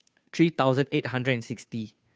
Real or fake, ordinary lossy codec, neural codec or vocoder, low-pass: fake; none; codec, 16 kHz, 2 kbps, FunCodec, trained on Chinese and English, 25 frames a second; none